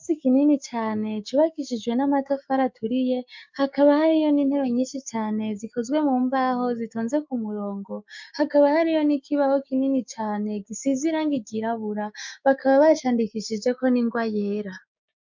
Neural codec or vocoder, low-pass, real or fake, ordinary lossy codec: codec, 16 kHz, 6 kbps, DAC; 7.2 kHz; fake; MP3, 64 kbps